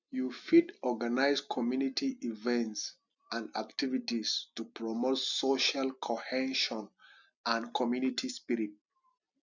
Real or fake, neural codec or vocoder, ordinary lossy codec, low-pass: real; none; none; 7.2 kHz